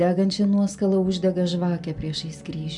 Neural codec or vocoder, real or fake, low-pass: none; real; 10.8 kHz